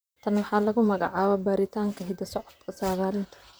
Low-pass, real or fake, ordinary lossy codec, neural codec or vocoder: none; fake; none; vocoder, 44.1 kHz, 128 mel bands, Pupu-Vocoder